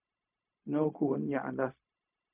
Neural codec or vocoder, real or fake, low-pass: codec, 16 kHz, 0.4 kbps, LongCat-Audio-Codec; fake; 3.6 kHz